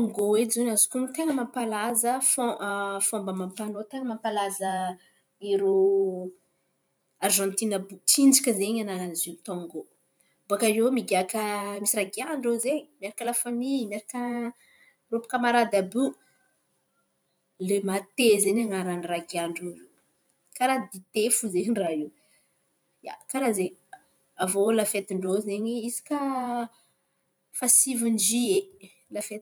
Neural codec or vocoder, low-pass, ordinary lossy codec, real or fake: vocoder, 44.1 kHz, 128 mel bands every 512 samples, BigVGAN v2; none; none; fake